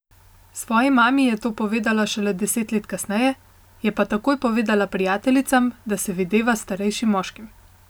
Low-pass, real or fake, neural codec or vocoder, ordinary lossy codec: none; real; none; none